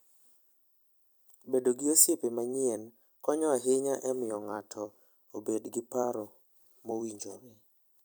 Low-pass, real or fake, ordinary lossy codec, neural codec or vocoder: none; fake; none; vocoder, 44.1 kHz, 128 mel bands every 256 samples, BigVGAN v2